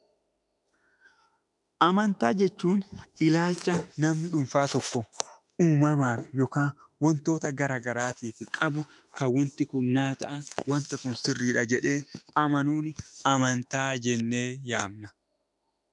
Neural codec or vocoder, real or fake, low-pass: autoencoder, 48 kHz, 32 numbers a frame, DAC-VAE, trained on Japanese speech; fake; 10.8 kHz